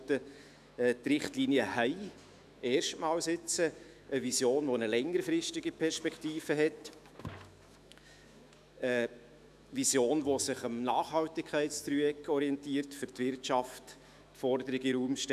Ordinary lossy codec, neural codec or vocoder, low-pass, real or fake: none; autoencoder, 48 kHz, 128 numbers a frame, DAC-VAE, trained on Japanese speech; 14.4 kHz; fake